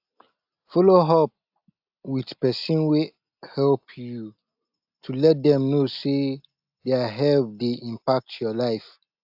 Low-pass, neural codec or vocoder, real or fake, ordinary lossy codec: 5.4 kHz; none; real; AAC, 48 kbps